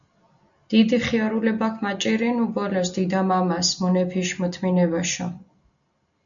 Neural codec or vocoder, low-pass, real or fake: none; 7.2 kHz; real